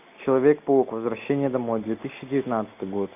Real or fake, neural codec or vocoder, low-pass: real; none; 3.6 kHz